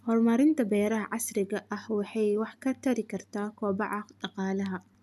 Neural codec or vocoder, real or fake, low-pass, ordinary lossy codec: none; real; 14.4 kHz; none